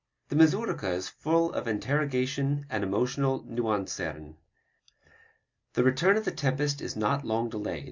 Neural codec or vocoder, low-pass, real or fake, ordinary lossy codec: none; 7.2 kHz; real; MP3, 64 kbps